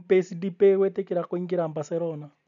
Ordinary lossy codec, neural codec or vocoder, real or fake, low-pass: none; none; real; 7.2 kHz